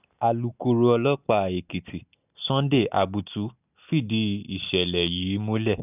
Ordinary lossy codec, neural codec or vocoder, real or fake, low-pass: none; none; real; 3.6 kHz